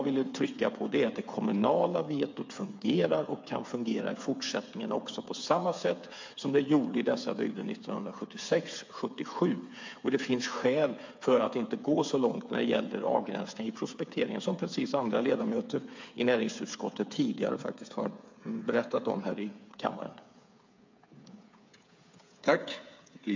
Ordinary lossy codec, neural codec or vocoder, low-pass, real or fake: MP3, 48 kbps; codec, 16 kHz, 8 kbps, FreqCodec, smaller model; 7.2 kHz; fake